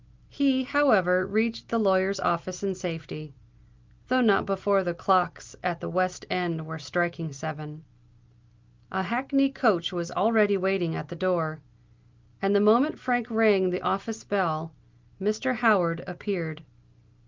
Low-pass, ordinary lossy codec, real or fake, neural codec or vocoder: 7.2 kHz; Opus, 32 kbps; real; none